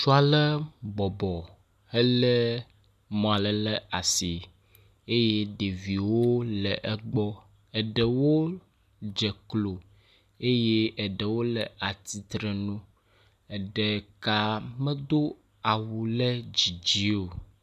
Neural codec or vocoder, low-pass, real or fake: none; 14.4 kHz; real